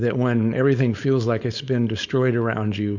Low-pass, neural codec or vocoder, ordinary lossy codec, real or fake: 7.2 kHz; codec, 16 kHz, 4.8 kbps, FACodec; Opus, 64 kbps; fake